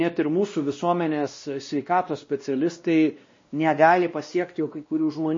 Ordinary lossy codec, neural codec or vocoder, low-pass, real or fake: MP3, 32 kbps; codec, 16 kHz, 1 kbps, X-Codec, WavLM features, trained on Multilingual LibriSpeech; 7.2 kHz; fake